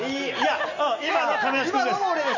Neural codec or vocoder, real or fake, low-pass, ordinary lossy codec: none; real; 7.2 kHz; none